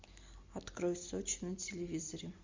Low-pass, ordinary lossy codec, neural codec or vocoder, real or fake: 7.2 kHz; MP3, 64 kbps; none; real